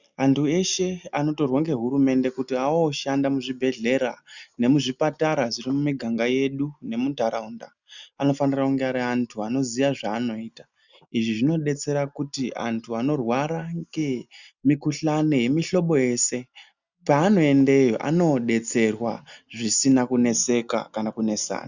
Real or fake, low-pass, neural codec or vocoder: real; 7.2 kHz; none